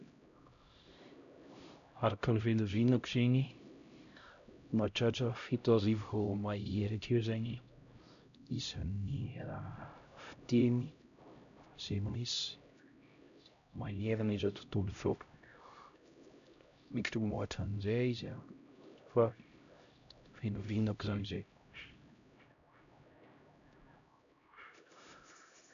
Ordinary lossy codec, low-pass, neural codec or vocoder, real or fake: none; 7.2 kHz; codec, 16 kHz, 0.5 kbps, X-Codec, HuBERT features, trained on LibriSpeech; fake